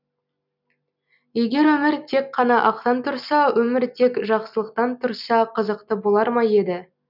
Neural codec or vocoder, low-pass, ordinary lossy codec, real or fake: none; 5.4 kHz; none; real